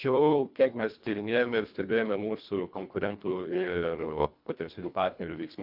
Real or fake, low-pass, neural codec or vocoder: fake; 5.4 kHz; codec, 24 kHz, 1.5 kbps, HILCodec